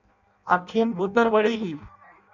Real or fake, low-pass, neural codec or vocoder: fake; 7.2 kHz; codec, 16 kHz in and 24 kHz out, 0.6 kbps, FireRedTTS-2 codec